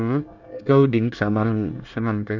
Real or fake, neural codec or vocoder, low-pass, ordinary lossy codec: fake; codec, 24 kHz, 1 kbps, SNAC; 7.2 kHz; none